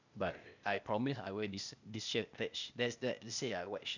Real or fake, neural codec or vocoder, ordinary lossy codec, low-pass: fake; codec, 16 kHz, 0.8 kbps, ZipCodec; none; 7.2 kHz